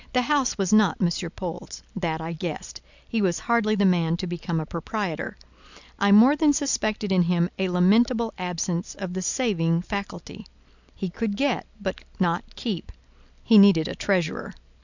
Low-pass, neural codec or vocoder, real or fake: 7.2 kHz; none; real